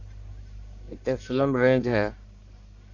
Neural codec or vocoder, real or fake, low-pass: codec, 44.1 kHz, 1.7 kbps, Pupu-Codec; fake; 7.2 kHz